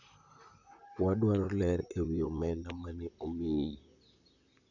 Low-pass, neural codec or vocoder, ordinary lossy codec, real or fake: 7.2 kHz; vocoder, 44.1 kHz, 128 mel bands, Pupu-Vocoder; none; fake